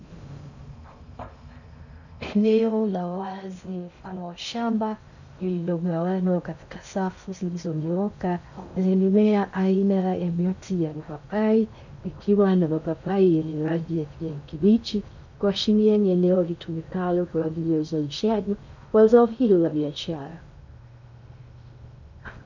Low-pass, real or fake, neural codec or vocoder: 7.2 kHz; fake; codec, 16 kHz in and 24 kHz out, 0.6 kbps, FocalCodec, streaming, 2048 codes